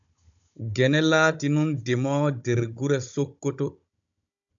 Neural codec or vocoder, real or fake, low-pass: codec, 16 kHz, 16 kbps, FunCodec, trained on Chinese and English, 50 frames a second; fake; 7.2 kHz